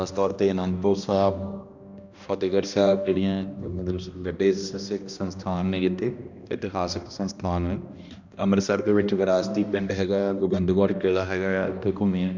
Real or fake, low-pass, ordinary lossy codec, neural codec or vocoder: fake; 7.2 kHz; Opus, 64 kbps; codec, 16 kHz, 1 kbps, X-Codec, HuBERT features, trained on balanced general audio